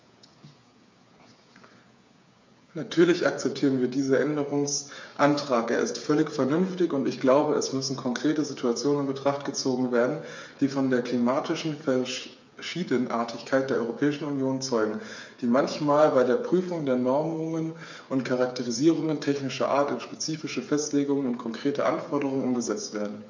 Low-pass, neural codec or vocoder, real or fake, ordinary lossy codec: 7.2 kHz; codec, 16 kHz, 8 kbps, FreqCodec, smaller model; fake; MP3, 48 kbps